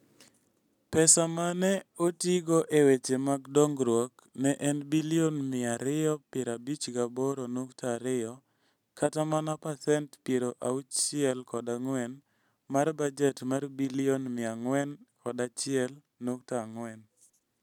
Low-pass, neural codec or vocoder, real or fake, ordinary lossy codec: 19.8 kHz; none; real; none